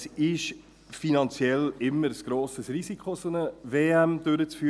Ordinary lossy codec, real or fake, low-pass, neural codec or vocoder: none; real; none; none